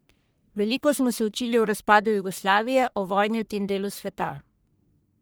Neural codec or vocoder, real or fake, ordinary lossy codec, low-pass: codec, 44.1 kHz, 1.7 kbps, Pupu-Codec; fake; none; none